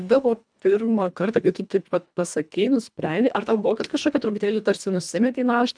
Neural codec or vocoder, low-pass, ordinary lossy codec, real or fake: codec, 24 kHz, 1.5 kbps, HILCodec; 9.9 kHz; MP3, 96 kbps; fake